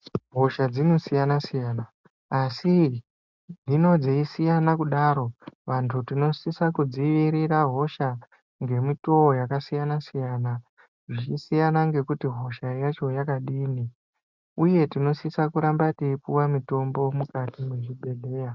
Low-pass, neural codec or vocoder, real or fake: 7.2 kHz; none; real